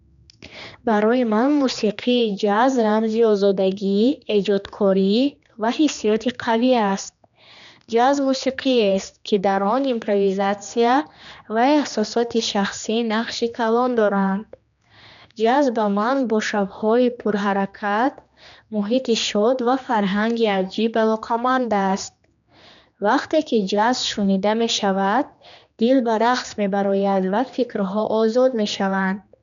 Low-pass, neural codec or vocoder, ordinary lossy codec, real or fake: 7.2 kHz; codec, 16 kHz, 2 kbps, X-Codec, HuBERT features, trained on general audio; none; fake